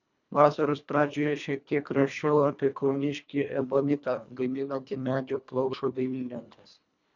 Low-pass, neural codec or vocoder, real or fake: 7.2 kHz; codec, 24 kHz, 1.5 kbps, HILCodec; fake